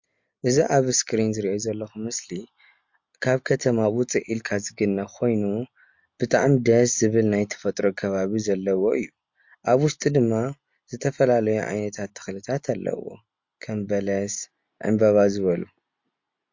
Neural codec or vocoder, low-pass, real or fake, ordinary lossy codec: none; 7.2 kHz; real; MP3, 48 kbps